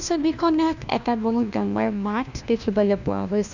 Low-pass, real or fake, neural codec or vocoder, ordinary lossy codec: 7.2 kHz; fake; codec, 16 kHz, 1 kbps, FunCodec, trained on LibriTTS, 50 frames a second; none